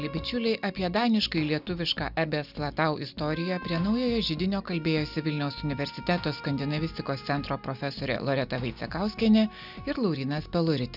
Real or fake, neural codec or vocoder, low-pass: real; none; 5.4 kHz